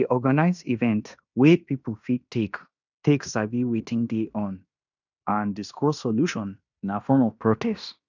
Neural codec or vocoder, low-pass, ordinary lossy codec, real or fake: codec, 16 kHz in and 24 kHz out, 0.9 kbps, LongCat-Audio-Codec, fine tuned four codebook decoder; 7.2 kHz; none; fake